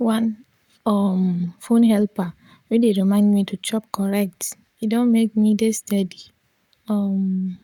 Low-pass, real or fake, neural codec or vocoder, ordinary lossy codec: 19.8 kHz; fake; codec, 44.1 kHz, 7.8 kbps, Pupu-Codec; none